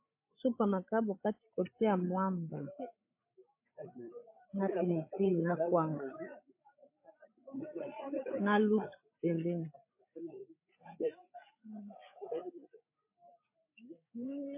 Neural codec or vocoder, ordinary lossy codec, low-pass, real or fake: codec, 16 kHz, 8 kbps, FreqCodec, larger model; MP3, 24 kbps; 3.6 kHz; fake